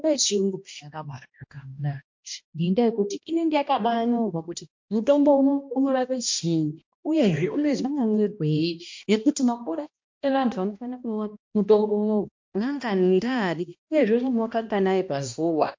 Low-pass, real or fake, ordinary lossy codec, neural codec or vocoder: 7.2 kHz; fake; MP3, 48 kbps; codec, 16 kHz, 1 kbps, X-Codec, HuBERT features, trained on balanced general audio